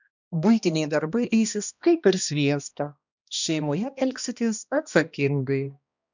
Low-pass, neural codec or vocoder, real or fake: 7.2 kHz; codec, 16 kHz, 1 kbps, X-Codec, HuBERT features, trained on balanced general audio; fake